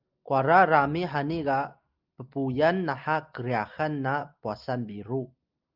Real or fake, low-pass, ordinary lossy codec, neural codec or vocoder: real; 5.4 kHz; Opus, 24 kbps; none